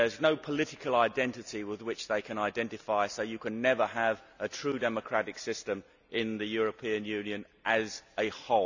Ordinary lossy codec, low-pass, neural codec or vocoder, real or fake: none; 7.2 kHz; none; real